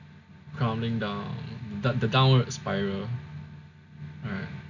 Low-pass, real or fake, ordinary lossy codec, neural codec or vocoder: 7.2 kHz; real; none; none